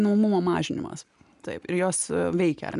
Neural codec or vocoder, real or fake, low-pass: none; real; 10.8 kHz